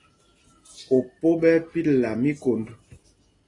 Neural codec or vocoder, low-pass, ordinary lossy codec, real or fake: none; 10.8 kHz; AAC, 32 kbps; real